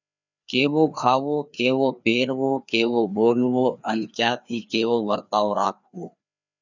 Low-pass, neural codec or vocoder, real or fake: 7.2 kHz; codec, 16 kHz, 2 kbps, FreqCodec, larger model; fake